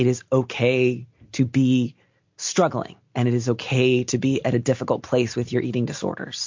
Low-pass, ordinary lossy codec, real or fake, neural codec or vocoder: 7.2 kHz; MP3, 48 kbps; real; none